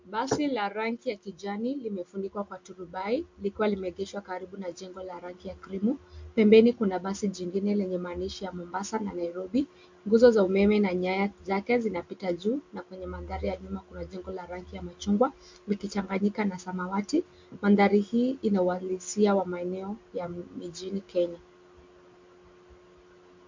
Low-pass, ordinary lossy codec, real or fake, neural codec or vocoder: 7.2 kHz; MP3, 48 kbps; real; none